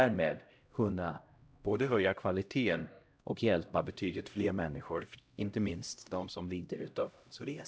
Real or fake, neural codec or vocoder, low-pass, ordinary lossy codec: fake; codec, 16 kHz, 0.5 kbps, X-Codec, HuBERT features, trained on LibriSpeech; none; none